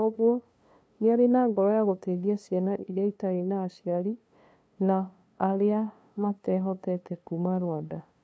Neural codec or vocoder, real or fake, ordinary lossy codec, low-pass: codec, 16 kHz, 2 kbps, FunCodec, trained on LibriTTS, 25 frames a second; fake; none; none